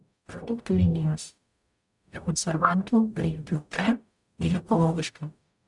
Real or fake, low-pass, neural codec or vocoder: fake; 10.8 kHz; codec, 44.1 kHz, 0.9 kbps, DAC